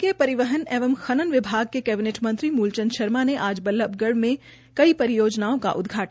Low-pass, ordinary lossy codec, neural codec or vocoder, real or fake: none; none; none; real